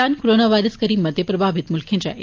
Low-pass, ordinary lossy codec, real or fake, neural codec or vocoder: 7.2 kHz; Opus, 24 kbps; real; none